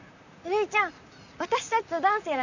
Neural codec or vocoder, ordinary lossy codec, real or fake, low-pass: vocoder, 44.1 kHz, 128 mel bands, Pupu-Vocoder; none; fake; 7.2 kHz